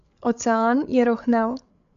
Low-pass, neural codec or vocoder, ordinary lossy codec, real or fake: 7.2 kHz; codec, 16 kHz, 8 kbps, FreqCodec, larger model; AAC, 64 kbps; fake